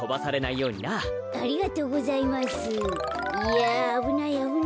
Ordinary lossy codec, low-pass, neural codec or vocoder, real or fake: none; none; none; real